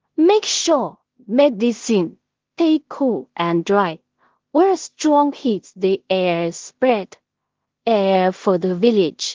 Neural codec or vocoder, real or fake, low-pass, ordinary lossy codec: codec, 16 kHz in and 24 kHz out, 0.4 kbps, LongCat-Audio-Codec, two codebook decoder; fake; 7.2 kHz; Opus, 16 kbps